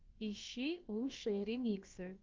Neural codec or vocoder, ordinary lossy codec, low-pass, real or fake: codec, 16 kHz, about 1 kbps, DyCAST, with the encoder's durations; Opus, 24 kbps; 7.2 kHz; fake